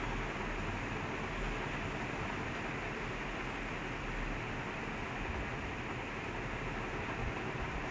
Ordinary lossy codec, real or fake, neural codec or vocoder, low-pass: none; real; none; none